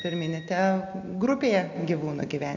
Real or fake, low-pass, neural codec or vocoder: real; 7.2 kHz; none